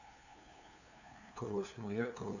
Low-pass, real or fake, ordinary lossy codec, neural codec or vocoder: 7.2 kHz; fake; none; codec, 16 kHz, 2 kbps, FunCodec, trained on LibriTTS, 25 frames a second